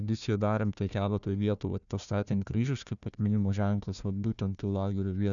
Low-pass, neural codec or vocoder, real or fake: 7.2 kHz; codec, 16 kHz, 1 kbps, FunCodec, trained on Chinese and English, 50 frames a second; fake